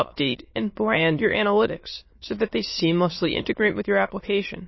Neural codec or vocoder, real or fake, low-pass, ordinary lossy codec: autoencoder, 22.05 kHz, a latent of 192 numbers a frame, VITS, trained on many speakers; fake; 7.2 kHz; MP3, 24 kbps